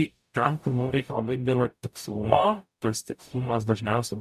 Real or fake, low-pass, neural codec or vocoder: fake; 14.4 kHz; codec, 44.1 kHz, 0.9 kbps, DAC